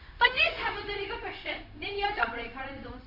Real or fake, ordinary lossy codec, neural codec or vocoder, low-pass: fake; none; codec, 16 kHz, 0.4 kbps, LongCat-Audio-Codec; 5.4 kHz